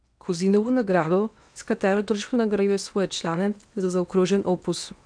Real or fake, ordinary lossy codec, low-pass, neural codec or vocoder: fake; none; 9.9 kHz; codec, 16 kHz in and 24 kHz out, 0.8 kbps, FocalCodec, streaming, 65536 codes